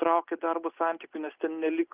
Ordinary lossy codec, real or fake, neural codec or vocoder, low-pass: Opus, 32 kbps; real; none; 3.6 kHz